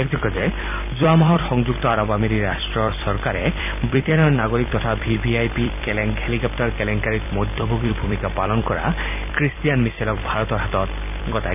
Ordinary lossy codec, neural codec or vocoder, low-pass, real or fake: none; vocoder, 44.1 kHz, 128 mel bands every 256 samples, BigVGAN v2; 3.6 kHz; fake